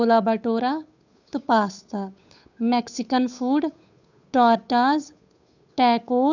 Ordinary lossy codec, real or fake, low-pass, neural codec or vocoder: none; fake; 7.2 kHz; codec, 16 kHz, 8 kbps, FunCodec, trained on Chinese and English, 25 frames a second